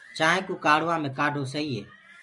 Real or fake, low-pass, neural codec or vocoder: real; 10.8 kHz; none